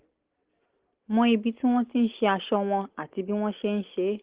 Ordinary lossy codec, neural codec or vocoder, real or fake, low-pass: Opus, 16 kbps; none; real; 3.6 kHz